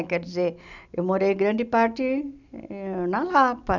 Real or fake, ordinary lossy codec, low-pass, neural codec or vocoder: real; none; 7.2 kHz; none